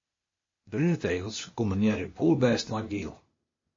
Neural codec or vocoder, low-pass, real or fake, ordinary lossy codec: codec, 16 kHz, 0.8 kbps, ZipCodec; 7.2 kHz; fake; MP3, 32 kbps